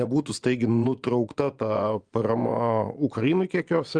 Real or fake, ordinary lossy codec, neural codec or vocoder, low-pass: fake; Opus, 32 kbps; vocoder, 24 kHz, 100 mel bands, Vocos; 9.9 kHz